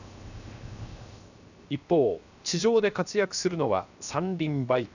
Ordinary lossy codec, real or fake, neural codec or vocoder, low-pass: none; fake; codec, 16 kHz, 0.7 kbps, FocalCodec; 7.2 kHz